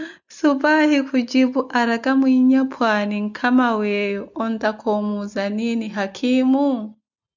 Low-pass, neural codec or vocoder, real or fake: 7.2 kHz; none; real